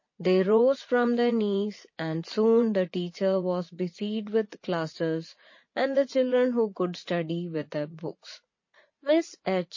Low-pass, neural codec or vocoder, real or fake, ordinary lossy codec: 7.2 kHz; vocoder, 44.1 kHz, 80 mel bands, Vocos; fake; MP3, 32 kbps